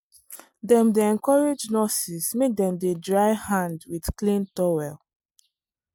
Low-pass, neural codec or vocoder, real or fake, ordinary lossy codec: 19.8 kHz; none; real; MP3, 96 kbps